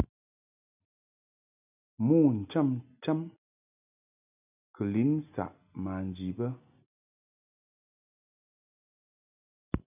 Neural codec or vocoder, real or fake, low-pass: none; real; 3.6 kHz